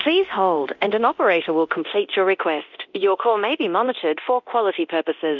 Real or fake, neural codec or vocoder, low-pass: fake; codec, 24 kHz, 0.9 kbps, DualCodec; 7.2 kHz